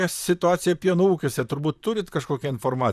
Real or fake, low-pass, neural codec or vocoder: fake; 14.4 kHz; vocoder, 44.1 kHz, 128 mel bands, Pupu-Vocoder